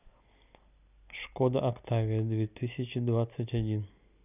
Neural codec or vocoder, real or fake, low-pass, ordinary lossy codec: none; real; 3.6 kHz; none